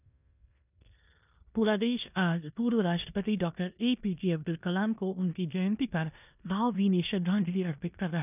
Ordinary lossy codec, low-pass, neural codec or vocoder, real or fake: none; 3.6 kHz; codec, 16 kHz in and 24 kHz out, 0.9 kbps, LongCat-Audio-Codec, four codebook decoder; fake